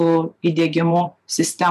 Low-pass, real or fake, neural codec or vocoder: 14.4 kHz; fake; vocoder, 44.1 kHz, 128 mel bands every 256 samples, BigVGAN v2